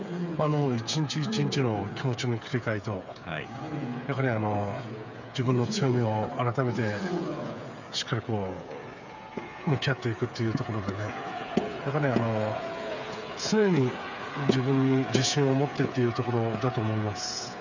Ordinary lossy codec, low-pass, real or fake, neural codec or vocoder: none; 7.2 kHz; fake; codec, 16 kHz, 8 kbps, FreqCodec, smaller model